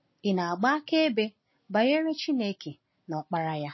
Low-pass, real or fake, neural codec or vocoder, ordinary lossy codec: 7.2 kHz; real; none; MP3, 24 kbps